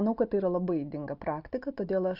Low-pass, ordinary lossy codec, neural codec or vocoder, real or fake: 5.4 kHz; AAC, 48 kbps; none; real